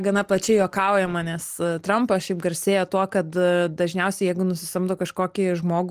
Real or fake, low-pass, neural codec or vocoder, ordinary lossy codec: real; 14.4 kHz; none; Opus, 24 kbps